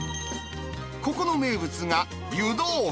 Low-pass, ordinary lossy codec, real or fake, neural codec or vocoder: none; none; real; none